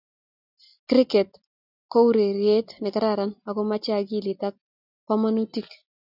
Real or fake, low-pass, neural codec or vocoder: real; 5.4 kHz; none